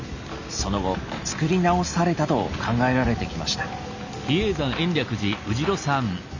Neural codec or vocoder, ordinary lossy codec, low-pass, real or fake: none; none; 7.2 kHz; real